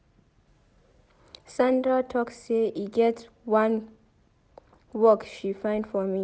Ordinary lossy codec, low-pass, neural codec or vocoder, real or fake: none; none; none; real